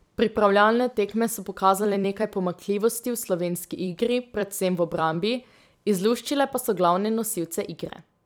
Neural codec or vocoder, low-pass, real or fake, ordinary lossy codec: vocoder, 44.1 kHz, 128 mel bands every 256 samples, BigVGAN v2; none; fake; none